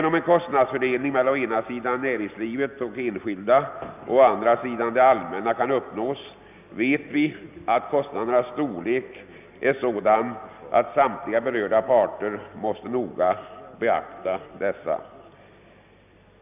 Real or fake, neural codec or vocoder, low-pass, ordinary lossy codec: real; none; 3.6 kHz; none